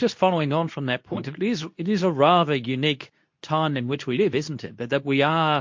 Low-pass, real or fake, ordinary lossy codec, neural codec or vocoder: 7.2 kHz; fake; MP3, 48 kbps; codec, 24 kHz, 0.9 kbps, WavTokenizer, medium speech release version 2